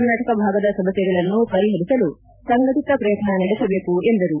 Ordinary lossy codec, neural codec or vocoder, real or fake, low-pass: none; vocoder, 44.1 kHz, 128 mel bands every 512 samples, BigVGAN v2; fake; 3.6 kHz